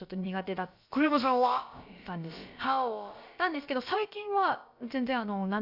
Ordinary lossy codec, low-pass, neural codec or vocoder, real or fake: none; 5.4 kHz; codec, 16 kHz, about 1 kbps, DyCAST, with the encoder's durations; fake